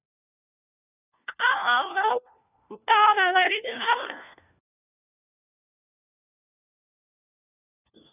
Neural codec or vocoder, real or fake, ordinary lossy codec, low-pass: codec, 16 kHz, 1 kbps, FunCodec, trained on LibriTTS, 50 frames a second; fake; none; 3.6 kHz